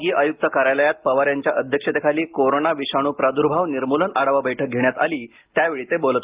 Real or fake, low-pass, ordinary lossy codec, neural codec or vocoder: real; 3.6 kHz; Opus, 32 kbps; none